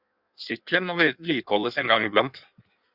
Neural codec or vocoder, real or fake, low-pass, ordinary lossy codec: codec, 16 kHz in and 24 kHz out, 1.1 kbps, FireRedTTS-2 codec; fake; 5.4 kHz; Opus, 64 kbps